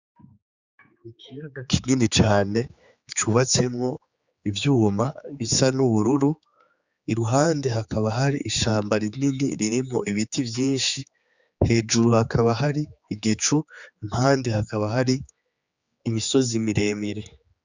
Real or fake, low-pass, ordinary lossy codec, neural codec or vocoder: fake; 7.2 kHz; Opus, 64 kbps; codec, 16 kHz, 4 kbps, X-Codec, HuBERT features, trained on general audio